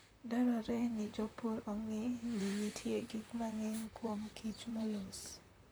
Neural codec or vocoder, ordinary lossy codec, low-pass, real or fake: vocoder, 44.1 kHz, 128 mel bands, Pupu-Vocoder; none; none; fake